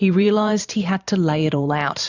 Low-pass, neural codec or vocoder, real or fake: 7.2 kHz; vocoder, 44.1 kHz, 128 mel bands every 512 samples, BigVGAN v2; fake